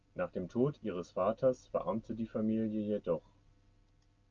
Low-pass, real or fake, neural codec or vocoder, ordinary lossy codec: 7.2 kHz; real; none; Opus, 24 kbps